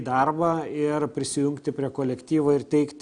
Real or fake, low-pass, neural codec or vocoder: real; 9.9 kHz; none